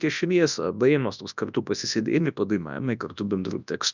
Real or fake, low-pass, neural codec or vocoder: fake; 7.2 kHz; codec, 24 kHz, 0.9 kbps, WavTokenizer, large speech release